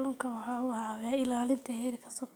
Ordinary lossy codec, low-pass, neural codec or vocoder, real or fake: none; none; codec, 44.1 kHz, 7.8 kbps, DAC; fake